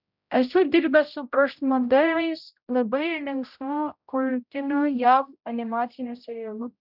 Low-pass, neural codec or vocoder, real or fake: 5.4 kHz; codec, 16 kHz, 0.5 kbps, X-Codec, HuBERT features, trained on general audio; fake